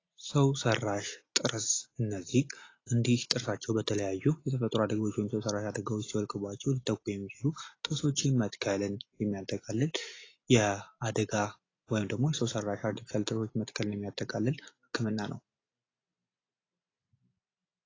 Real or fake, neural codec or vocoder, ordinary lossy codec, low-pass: real; none; AAC, 32 kbps; 7.2 kHz